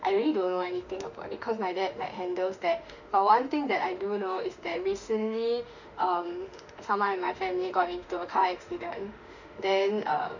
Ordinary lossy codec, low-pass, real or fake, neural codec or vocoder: none; 7.2 kHz; fake; autoencoder, 48 kHz, 32 numbers a frame, DAC-VAE, trained on Japanese speech